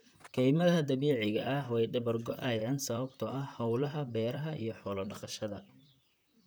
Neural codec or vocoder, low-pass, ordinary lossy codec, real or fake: vocoder, 44.1 kHz, 128 mel bands, Pupu-Vocoder; none; none; fake